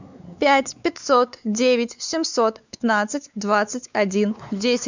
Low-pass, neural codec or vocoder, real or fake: 7.2 kHz; codec, 16 kHz, 4 kbps, X-Codec, WavLM features, trained on Multilingual LibriSpeech; fake